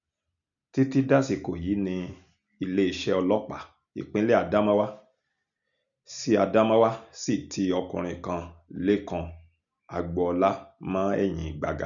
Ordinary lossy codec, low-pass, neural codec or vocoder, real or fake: none; 7.2 kHz; none; real